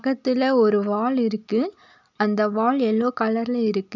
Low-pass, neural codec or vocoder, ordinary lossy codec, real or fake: 7.2 kHz; none; none; real